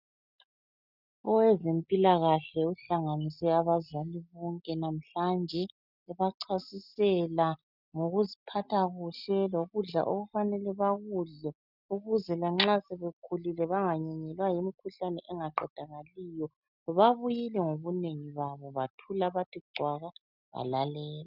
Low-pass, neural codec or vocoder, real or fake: 5.4 kHz; none; real